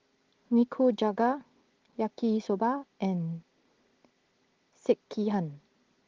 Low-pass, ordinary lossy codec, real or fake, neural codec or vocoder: 7.2 kHz; Opus, 16 kbps; real; none